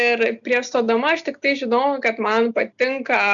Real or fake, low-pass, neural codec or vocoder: real; 7.2 kHz; none